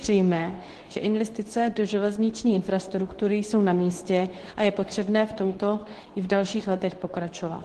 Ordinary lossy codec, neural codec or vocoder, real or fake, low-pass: Opus, 16 kbps; codec, 24 kHz, 0.9 kbps, WavTokenizer, medium speech release version 2; fake; 10.8 kHz